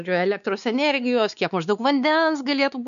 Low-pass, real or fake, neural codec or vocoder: 7.2 kHz; fake; codec, 16 kHz, 4 kbps, X-Codec, WavLM features, trained on Multilingual LibriSpeech